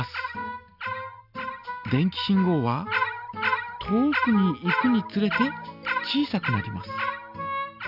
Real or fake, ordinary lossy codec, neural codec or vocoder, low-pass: real; none; none; 5.4 kHz